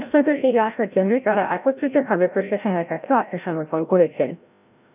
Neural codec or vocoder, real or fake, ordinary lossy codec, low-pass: codec, 16 kHz, 0.5 kbps, FreqCodec, larger model; fake; none; 3.6 kHz